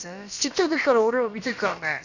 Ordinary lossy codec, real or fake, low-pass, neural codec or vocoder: none; fake; 7.2 kHz; codec, 16 kHz, about 1 kbps, DyCAST, with the encoder's durations